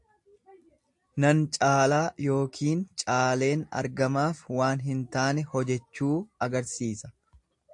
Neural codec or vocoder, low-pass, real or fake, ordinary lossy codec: none; 10.8 kHz; real; AAC, 64 kbps